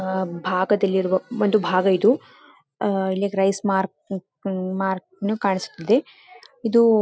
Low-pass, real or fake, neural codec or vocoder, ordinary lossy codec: none; real; none; none